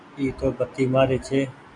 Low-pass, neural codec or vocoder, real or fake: 10.8 kHz; none; real